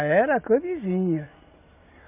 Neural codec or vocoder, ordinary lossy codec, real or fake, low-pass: none; MP3, 32 kbps; real; 3.6 kHz